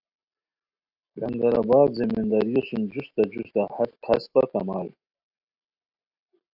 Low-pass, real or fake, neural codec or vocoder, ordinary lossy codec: 5.4 kHz; real; none; AAC, 48 kbps